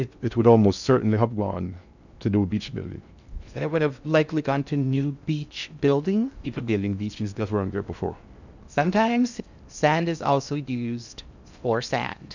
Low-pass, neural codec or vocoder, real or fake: 7.2 kHz; codec, 16 kHz in and 24 kHz out, 0.6 kbps, FocalCodec, streaming, 2048 codes; fake